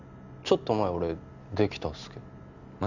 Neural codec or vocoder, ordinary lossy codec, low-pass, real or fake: none; none; 7.2 kHz; real